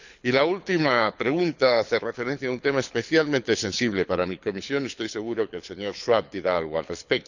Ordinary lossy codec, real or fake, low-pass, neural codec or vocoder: none; fake; 7.2 kHz; codec, 24 kHz, 6 kbps, HILCodec